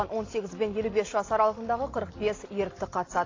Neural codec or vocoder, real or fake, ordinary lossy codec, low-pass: none; real; AAC, 32 kbps; 7.2 kHz